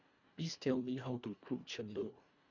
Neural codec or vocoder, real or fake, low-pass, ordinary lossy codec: codec, 24 kHz, 1.5 kbps, HILCodec; fake; 7.2 kHz; none